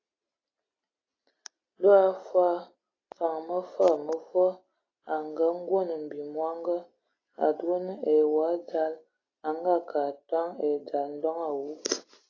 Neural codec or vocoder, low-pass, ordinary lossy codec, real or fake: none; 7.2 kHz; AAC, 32 kbps; real